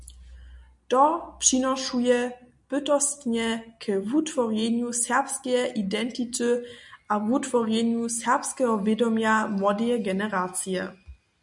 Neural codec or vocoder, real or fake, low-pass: none; real; 10.8 kHz